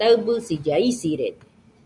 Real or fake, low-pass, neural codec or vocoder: real; 10.8 kHz; none